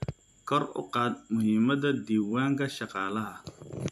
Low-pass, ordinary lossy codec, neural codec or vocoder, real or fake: 14.4 kHz; AAC, 96 kbps; vocoder, 44.1 kHz, 128 mel bands every 256 samples, BigVGAN v2; fake